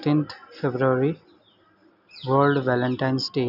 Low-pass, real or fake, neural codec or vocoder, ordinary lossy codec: 5.4 kHz; real; none; none